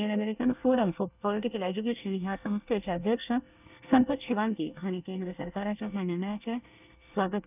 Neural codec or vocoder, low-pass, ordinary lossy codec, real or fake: codec, 24 kHz, 1 kbps, SNAC; 3.6 kHz; none; fake